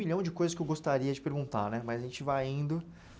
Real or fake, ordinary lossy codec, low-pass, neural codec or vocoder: real; none; none; none